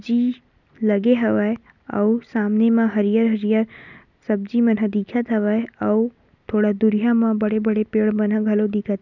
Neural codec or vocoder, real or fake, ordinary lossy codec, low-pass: none; real; none; 7.2 kHz